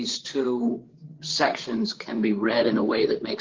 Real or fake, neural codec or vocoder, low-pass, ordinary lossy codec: fake; codec, 16 kHz, 4 kbps, FreqCodec, larger model; 7.2 kHz; Opus, 16 kbps